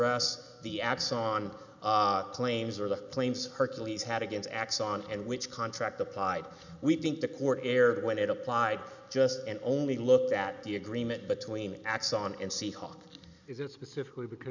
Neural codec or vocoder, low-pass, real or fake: none; 7.2 kHz; real